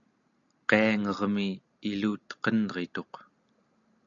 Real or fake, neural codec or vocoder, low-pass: real; none; 7.2 kHz